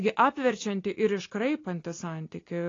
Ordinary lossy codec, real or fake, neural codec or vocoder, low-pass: AAC, 32 kbps; real; none; 7.2 kHz